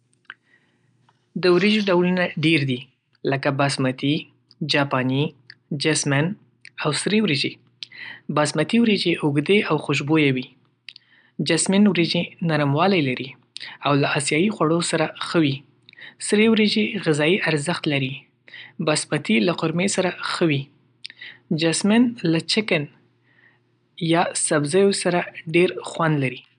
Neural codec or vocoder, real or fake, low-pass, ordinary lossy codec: none; real; 9.9 kHz; MP3, 96 kbps